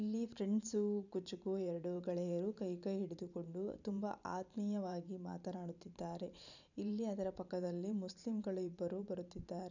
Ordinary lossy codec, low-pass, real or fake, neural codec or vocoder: none; 7.2 kHz; fake; vocoder, 44.1 kHz, 128 mel bands every 512 samples, BigVGAN v2